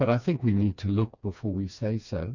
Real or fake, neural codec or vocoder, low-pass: fake; codec, 16 kHz, 2 kbps, FreqCodec, smaller model; 7.2 kHz